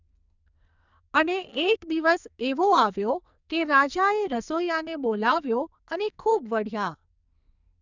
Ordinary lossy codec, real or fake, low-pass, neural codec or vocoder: none; fake; 7.2 kHz; codec, 44.1 kHz, 2.6 kbps, SNAC